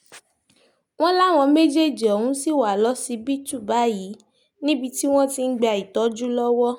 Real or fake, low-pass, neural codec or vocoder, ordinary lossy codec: real; 19.8 kHz; none; none